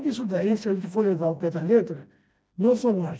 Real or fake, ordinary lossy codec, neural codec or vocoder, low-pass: fake; none; codec, 16 kHz, 1 kbps, FreqCodec, smaller model; none